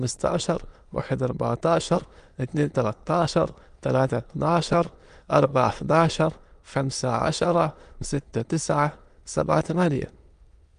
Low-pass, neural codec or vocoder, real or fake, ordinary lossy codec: 9.9 kHz; autoencoder, 22.05 kHz, a latent of 192 numbers a frame, VITS, trained on many speakers; fake; Opus, 32 kbps